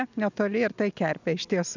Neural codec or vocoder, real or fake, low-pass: none; real; 7.2 kHz